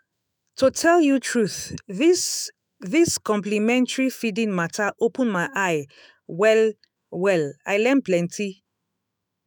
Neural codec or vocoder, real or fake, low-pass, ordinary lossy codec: autoencoder, 48 kHz, 128 numbers a frame, DAC-VAE, trained on Japanese speech; fake; none; none